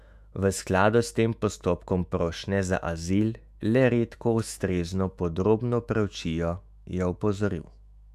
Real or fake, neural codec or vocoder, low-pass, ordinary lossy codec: fake; autoencoder, 48 kHz, 128 numbers a frame, DAC-VAE, trained on Japanese speech; 14.4 kHz; none